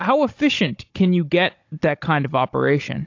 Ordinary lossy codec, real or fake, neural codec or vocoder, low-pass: AAC, 48 kbps; fake; codec, 16 kHz, 16 kbps, FunCodec, trained on Chinese and English, 50 frames a second; 7.2 kHz